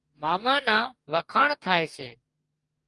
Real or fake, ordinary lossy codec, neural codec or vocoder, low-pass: fake; Opus, 32 kbps; codec, 44.1 kHz, 2.6 kbps, DAC; 10.8 kHz